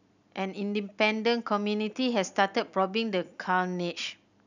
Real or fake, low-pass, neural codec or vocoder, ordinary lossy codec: real; 7.2 kHz; none; none